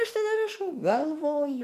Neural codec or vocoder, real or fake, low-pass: autoencoder, 48 kHz, 32 numbers a frame, DAC-VAE, trained on Japanese speech; fake; 14.4 kHz